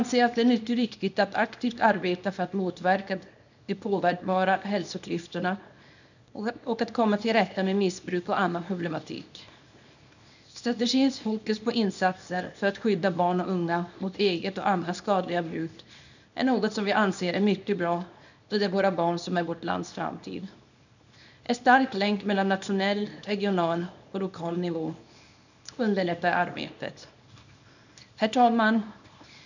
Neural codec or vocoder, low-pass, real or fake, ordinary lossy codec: codec, 24 kHz, 0.9 kbps, WavTokenizer, small release; 7.2 kHz; fake; none